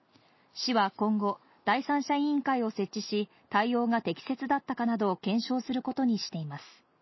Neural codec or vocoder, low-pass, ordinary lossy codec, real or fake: none; 7.2 kHz; MP3, 24 kbps; real